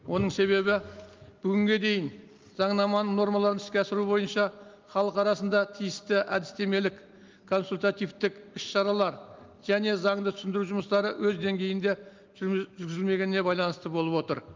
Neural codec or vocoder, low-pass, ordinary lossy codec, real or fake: none; 7.2 kHz; Opus, 32 kbps; real